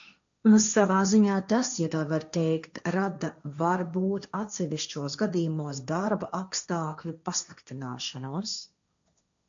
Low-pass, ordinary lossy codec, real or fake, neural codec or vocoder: 7.2 kHz; MP3, 64 kbps; fake; codec, 16 kHz, 1.1 kbps, Voila-Tokenizer